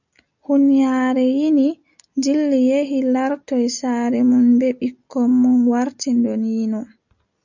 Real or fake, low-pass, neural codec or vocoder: real; 7.2 kHz; none